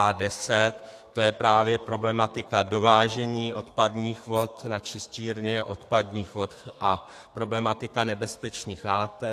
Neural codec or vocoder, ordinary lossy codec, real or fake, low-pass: codec, 32 kHz, 1.9 kbps, SNAC; AAC, 64 kbps; fake; 14.4 kHz